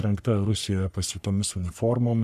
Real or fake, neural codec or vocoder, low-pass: fake; codec, 44.1 kHz, 3.4 kbps, Pupu-Codec; 14.4 kHz